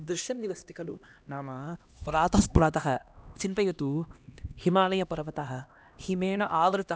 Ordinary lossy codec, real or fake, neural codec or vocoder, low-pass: none; fake; codec, 16 kHz, 1 kbps, X-Codec, HuBERT features, trained on LibriSpeech; none